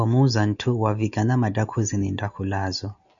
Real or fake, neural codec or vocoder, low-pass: real; none; 7.2 kHz